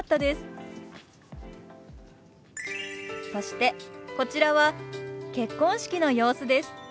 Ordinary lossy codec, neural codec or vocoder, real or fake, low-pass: none; none; real; none